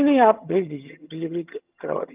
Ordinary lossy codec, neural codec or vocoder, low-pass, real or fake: Opus, 24 kbps; vocoder, 22.05 kHz, 80 mel bands, HiFi-GAN; 3.6 kHz; fake